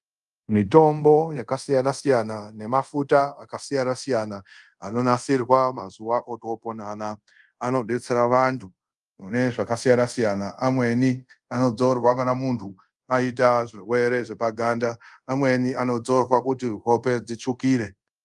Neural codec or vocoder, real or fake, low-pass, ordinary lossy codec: codec, 24 kHz, 0.5 kbps, DualCodec; fake; 10.8 kHz; Opus, 32 kbps